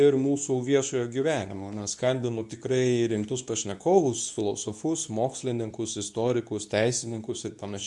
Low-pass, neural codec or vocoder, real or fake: 10.8 kHz; codec, 24 kHz, 0.9 kbps, WavTokenizer, medium speech release version 2; fake